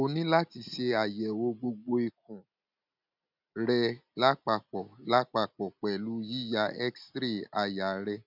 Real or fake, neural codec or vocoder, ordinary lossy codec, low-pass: real; none; none; 5.4 kHz